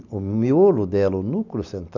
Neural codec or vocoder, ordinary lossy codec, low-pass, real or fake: none; none; 7.2 kHz; real